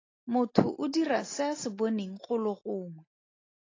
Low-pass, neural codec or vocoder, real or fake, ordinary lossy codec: 7.2 kHz; none; real; AAC, 32 kbps